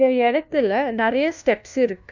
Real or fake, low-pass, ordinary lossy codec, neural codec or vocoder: fake; 7.2 kHz; none; codec, 16 kHz, 1 kbps, FunCodec, trained on LibriTTS, 50 frames a second